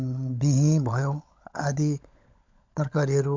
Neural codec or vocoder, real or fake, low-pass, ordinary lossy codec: codec, 16 kHz, 16 kbps, FunCodec, trained on LibriTTS, 50 frames a second; fake; 7.2 kHz; MP3, 64 kbps